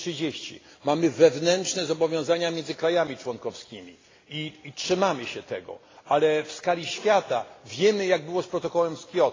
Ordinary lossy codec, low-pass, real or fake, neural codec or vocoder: AAC, 32 kbps; 7.2 kHz; real; none